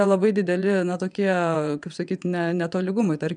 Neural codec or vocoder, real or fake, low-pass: vocoder, 22.05 kHz, 80 mel bands, WaveNeXt; fake; 9.9 kHz